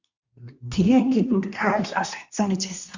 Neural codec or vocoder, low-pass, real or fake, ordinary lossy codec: codec, 24 kHz, 1 kbps, SNAC; 7.2 kHz; fake; Opus, 64 kbps